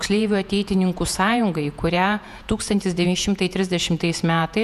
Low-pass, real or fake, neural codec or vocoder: 14.4 kHz; fake; vocoder, 48 kHz, 128 mel bands, Vocos